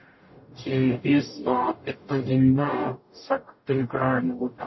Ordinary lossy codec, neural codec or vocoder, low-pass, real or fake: MP3, 24 kbps; codec, 44.1 kHz, 0.9 kbps, DAC; 7.2 kHz; fake